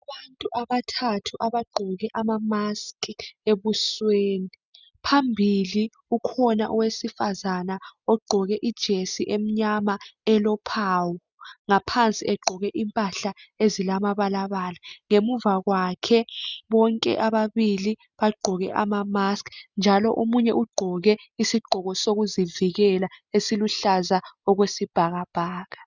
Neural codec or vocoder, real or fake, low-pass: none; real; 7.2 kHz